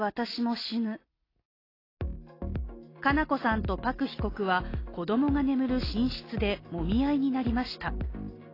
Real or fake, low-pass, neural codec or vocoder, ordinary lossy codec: real; 5.4 kHz; none; AAC, 24 kbps